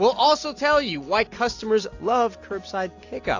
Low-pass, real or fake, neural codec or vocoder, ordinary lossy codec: 7.2 kHz; real; none; AAC, 48 kbps